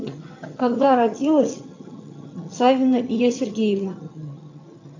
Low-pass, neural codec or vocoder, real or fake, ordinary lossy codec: 7.2 kHz; vocoder, 22.05 kHz, 80 mel bands, HiFi-GAN; fake; AAC, 48 kbps